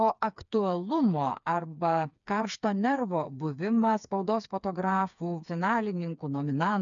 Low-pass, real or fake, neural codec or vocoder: 7.2 kHz; fake; codec, 16 kHz, 4 kbps, FreqCodec, smaller model